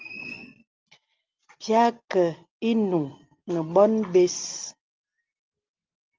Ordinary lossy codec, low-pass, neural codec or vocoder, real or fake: Opus, 24 kbps; 7.2 kHz; none; real